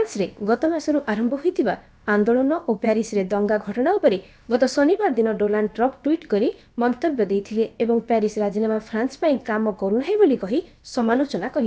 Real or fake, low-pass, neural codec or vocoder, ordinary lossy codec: fake; none; codec, 16 kHz, about 1 kbps, DyCAST, with the encoder's durations; none